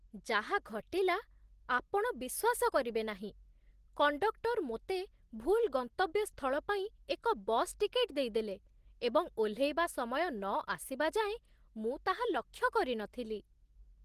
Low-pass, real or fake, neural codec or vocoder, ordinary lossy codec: 14.4 kHz; real; none; Opus, 16 kbps